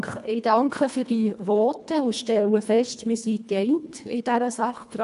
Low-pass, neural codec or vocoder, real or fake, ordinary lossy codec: 10.8 kHz; codec, 24 kHz, 1.5 kbps, HILCodec; fake; none